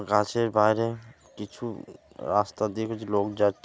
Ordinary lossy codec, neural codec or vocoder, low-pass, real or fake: none; none; none; real